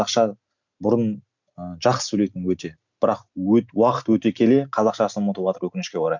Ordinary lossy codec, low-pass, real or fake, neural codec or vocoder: none; 7.2 kHz; real; none